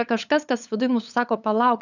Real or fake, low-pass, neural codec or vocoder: fake; 7.2 kHz; codec, 16 kHz, 8 kbps, FunCodec, trained on LibriTTS, 25 frames a second